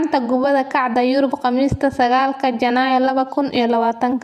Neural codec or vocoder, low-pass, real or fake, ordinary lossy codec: vocoder, 48 kHz, 128 mel bands, Vocos; 14.4 kHz; fake; none